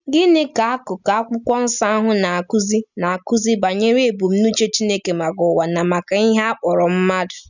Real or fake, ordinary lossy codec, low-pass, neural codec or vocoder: real; none; 7.2 kHz; none